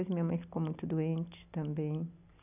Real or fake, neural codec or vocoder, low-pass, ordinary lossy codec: real; none; 3.6 kHz; none